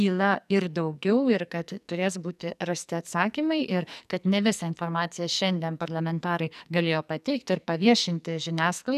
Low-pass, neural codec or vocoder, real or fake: 14.4 kHz; codec, 44.1 kHz, 2.6 kbps, SNAC; fake